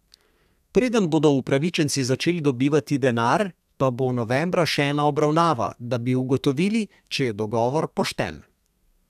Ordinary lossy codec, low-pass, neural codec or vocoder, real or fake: none; 14.4 kHz; codec, 32 kHz, 1.9 kbps, SNAC; fake